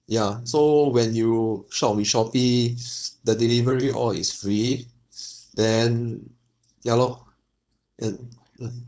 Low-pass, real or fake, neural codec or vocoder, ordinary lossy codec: none; fake; codec, 16 kHz, 4.8 kbps, FACodec; none